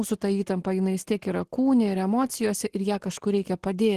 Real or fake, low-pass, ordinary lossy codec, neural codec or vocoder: real; 14.4 kHz; Opus, 16 kbps; none